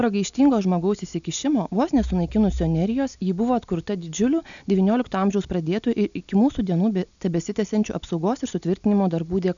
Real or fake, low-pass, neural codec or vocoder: real; 7.2 kHz; none